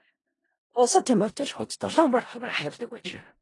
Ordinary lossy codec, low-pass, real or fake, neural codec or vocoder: AAC, 32 kbps; 10.8 kHz; fake; codec, 16 kHz in and 24 kHz out, 0.4 kbps, LongCat-Audio-Codec, four codebook decoder